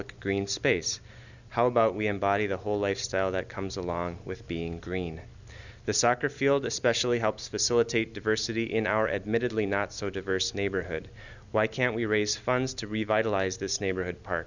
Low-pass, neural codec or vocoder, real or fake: 7.2 kHz; none; real